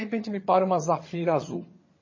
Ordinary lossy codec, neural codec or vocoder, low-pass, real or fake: MP3, 32 kbps; vocoder, 22.05 kHz, 80 mel bands, HiFi-GAN; 7.2 kHz; fake